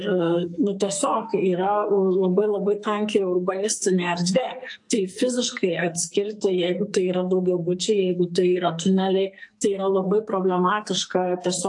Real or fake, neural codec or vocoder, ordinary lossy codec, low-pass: fake; codec, 44.1 kHz, 2.6 kbps, SNAC; AAC, 64 kbps; 10.8 kHz